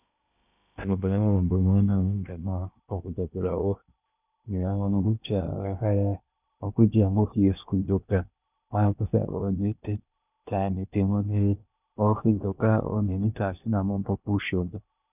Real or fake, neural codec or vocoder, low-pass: fake; codec, 16 kHz in and 24 kHz out, 0.8 kbps, FocalCodec, streaming, 65536 codes; 3.6 kHz